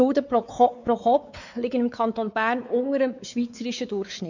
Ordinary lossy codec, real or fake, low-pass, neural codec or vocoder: none; fake; 7.2 kHz; codec, 16 kHz, 4 kbps, X-Codec, WavLM features, trained on Multilingual LibriSpeech